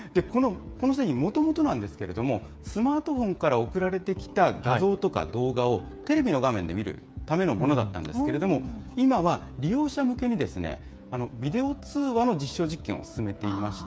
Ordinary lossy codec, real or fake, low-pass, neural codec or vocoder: none; fake; none; codec, 16 kHz, 8 kbps, FreqCodec, smaller model